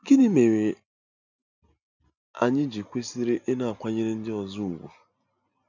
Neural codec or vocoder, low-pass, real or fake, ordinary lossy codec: none; 7.2 kHz; real; none